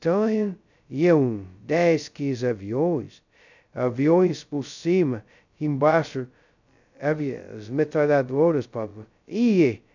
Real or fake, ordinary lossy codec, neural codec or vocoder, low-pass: fake; none; codec, 16 kHz, 0.2 kbps, FocalCodec; 7.2 kHz